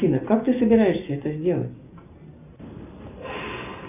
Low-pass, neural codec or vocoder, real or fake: 3.6 kHz; none; real